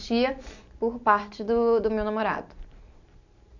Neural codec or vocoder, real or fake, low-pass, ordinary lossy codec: none; real; 7.2 kHz; none